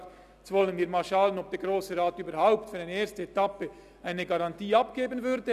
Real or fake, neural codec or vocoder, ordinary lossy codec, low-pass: real; none; none; 14.4 kHz